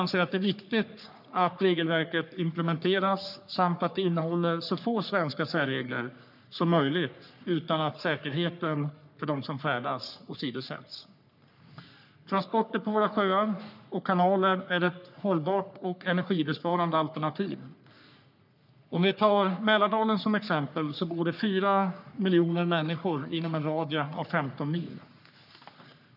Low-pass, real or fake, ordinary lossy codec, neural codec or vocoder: 5.4 kHz; fake; none; codec, 44.1 kHz, 3.4 kbps, Pupu-Codec